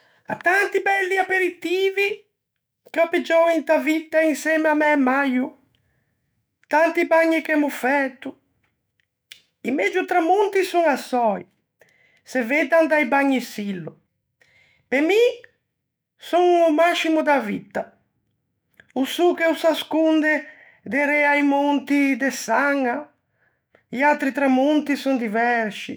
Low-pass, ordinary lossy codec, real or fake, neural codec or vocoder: none; none; fake; autoencoder, 48 kHz, 128 numbers a frame, DAC-VAE, trained on Japanese speech